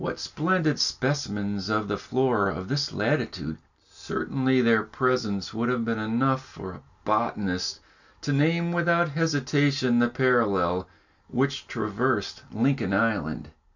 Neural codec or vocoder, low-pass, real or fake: none; 7.2 kHz; real